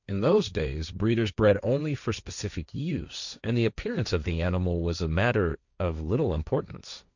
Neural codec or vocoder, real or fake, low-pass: codec, 16 kHz, 1.1 kbps, Voila-Tokenizer; fake; 7.2 kHz